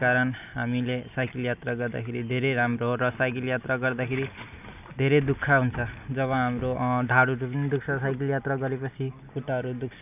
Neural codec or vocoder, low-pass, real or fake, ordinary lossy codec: none; 3.6 kHz; real; none